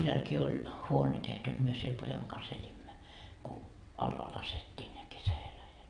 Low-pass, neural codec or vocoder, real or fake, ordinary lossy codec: 9.9 kHz; vocoder, 22.05 kHz, 80 mel bands, WaveNeXt; fake; none